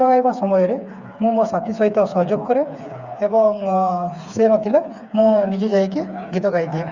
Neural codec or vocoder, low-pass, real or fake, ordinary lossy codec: codec, 16 kHz, 4 kbps, FreqCodec, smaller model; 7.2 kHz; fake; Opus, 64 kbps